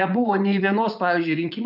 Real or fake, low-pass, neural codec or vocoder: fake; 5.4 kHz; vocoder, 44.1 kHz, 80 mel bands, Vocos